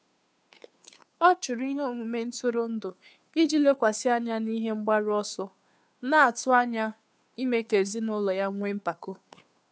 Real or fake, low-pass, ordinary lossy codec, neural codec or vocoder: fake; none; none; codec, 16 kHz, 2 kbps, FunCodec, trained on Chinese and English, 25 frames a second